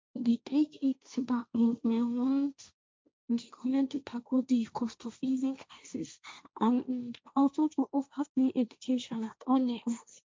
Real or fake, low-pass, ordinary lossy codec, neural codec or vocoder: fake; none; none; codec, 16 kHz, 1.1 kbps, Voila-Tokenizer